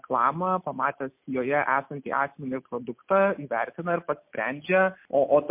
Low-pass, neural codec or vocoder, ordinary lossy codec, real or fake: 3.6 kHz; none; MP3, 32 kbps; real